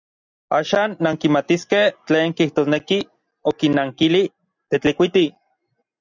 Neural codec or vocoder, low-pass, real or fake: none; 7.2 kHz; real